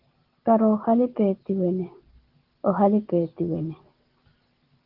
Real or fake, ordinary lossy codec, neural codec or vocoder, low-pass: real; Opus, 16 kbps; none; 5.4 kHz